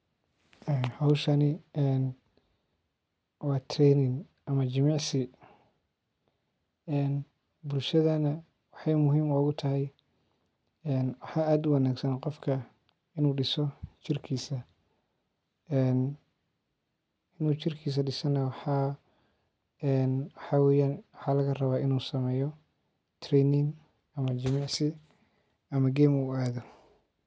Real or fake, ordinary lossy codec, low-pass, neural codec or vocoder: real; none; none; none